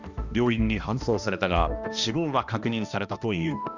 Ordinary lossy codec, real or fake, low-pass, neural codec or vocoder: none; fake; 7.2 kHz; codec, 16 kHz, 1 kbps, X-Codec, HuBERT features, trained on balanced general audio